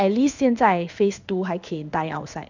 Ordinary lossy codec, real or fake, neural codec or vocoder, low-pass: none; fake; codec, 16 kHz in and 24 kHz out, 1 kbps, XY-Tokenizer; 7.2 kHz